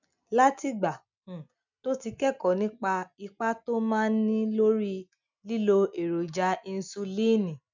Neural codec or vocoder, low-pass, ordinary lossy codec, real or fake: none; 7.2 kHz; none; real